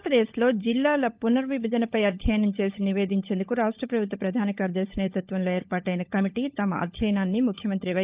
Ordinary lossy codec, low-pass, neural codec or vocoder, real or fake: Opus, 32 kbps; 3.6 kHz; codec, 16 kHz, 16 kbps, FunCodec, trained on LibriTTS, 50 frames a second; fake